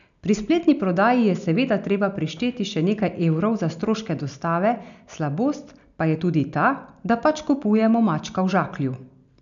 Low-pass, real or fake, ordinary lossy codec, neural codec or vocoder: 7.2 kHz; real; none; none